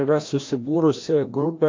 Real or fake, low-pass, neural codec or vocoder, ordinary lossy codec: fake; 7.2 kHz; codec, 16 kHz, 1 kbps, FreqCodec, larger model; MP3, 48 kbps